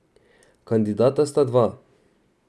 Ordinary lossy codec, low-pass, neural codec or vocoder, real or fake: none; none; none; real